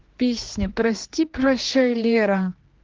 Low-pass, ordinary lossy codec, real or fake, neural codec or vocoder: 7.2 kHz; Opus, 16 kbps; fake; codec, 16 kHz, 2 kbps, X-Codec, HuBERT features, trained on general audio